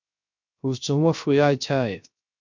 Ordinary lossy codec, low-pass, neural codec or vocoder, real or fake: MP3, 64 kbps; 7.2 kHz; codec, 16 kHz, 0.3 kbps, FocalCodec; fake